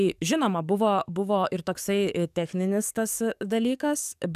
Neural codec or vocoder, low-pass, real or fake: codec, 44.1 kHz, 7.8 kbps, DAC; 14.4 kHz; fake